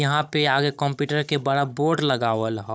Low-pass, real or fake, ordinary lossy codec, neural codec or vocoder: none; fake; none; codec, 16 kHz, 16 kbps, FunCodec, trained on Chinese and English, 50 frames a second